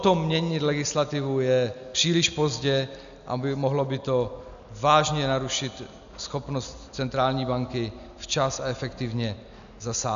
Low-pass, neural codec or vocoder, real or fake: 7.2 kHz; none; real